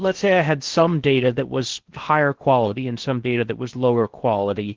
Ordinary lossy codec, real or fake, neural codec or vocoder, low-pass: Opus, 16 kbps; fake; codec, 16 kHz in and 24 kHz out, 0.6 kbps, FocalCodec, streaming, 4096 codes; 7.2 kHz